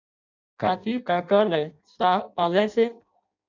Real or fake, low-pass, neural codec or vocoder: fake; 7.2 kHz; codec, 16 kHz in and 24 kHz out, 0.6 kbps, FireRedTTS-2 codec